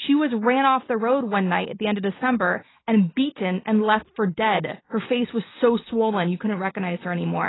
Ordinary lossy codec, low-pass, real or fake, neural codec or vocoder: AAC, 16 kbps; 7.2 kHz; real; none